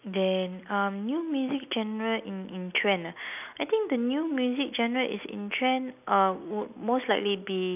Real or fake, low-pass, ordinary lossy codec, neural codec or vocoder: real; 3.6 kHz; none; none